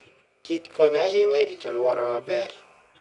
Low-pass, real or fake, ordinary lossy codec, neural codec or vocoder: 10.8 kHz; fake; none; codec, 24 kHz, 0.9 kbps, WavTokenizer, medium music audio release